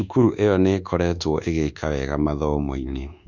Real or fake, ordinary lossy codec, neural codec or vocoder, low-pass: fake; none; codec, 24 kHz, 1.2 kbps, DualCodec; 7.2 kHz